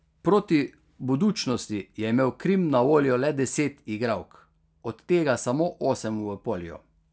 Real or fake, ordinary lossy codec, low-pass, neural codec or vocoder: real; none; none; none